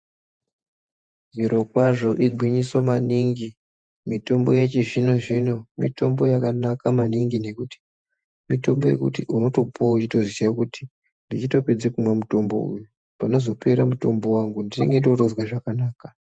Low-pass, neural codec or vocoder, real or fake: 9.9 kHz; vocoder, 48 kHz, 128 mel bands, Vocos; fake